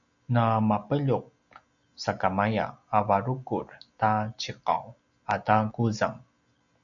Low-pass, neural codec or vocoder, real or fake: 7.2 kHz; none; real